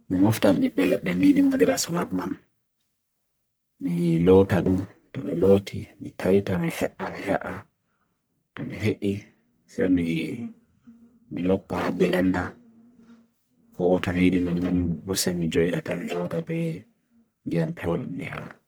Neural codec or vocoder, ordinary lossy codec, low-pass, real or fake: codec, 44.1 kHz, 1.7 kbps, Pupu-Codec; none; none; fake